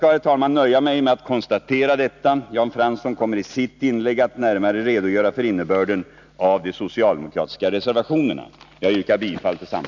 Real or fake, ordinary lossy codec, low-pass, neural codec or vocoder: real; none; 7.2 kHz; none